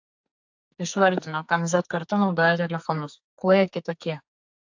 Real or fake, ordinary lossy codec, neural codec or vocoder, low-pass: fake; AAC, 48 kbps; codec, 44.1 kHz, 2.6 kbps, SNAC; 7.2 kHz